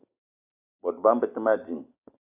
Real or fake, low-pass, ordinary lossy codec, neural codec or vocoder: real; 3.6 kHz; AAC, 24 kbps; none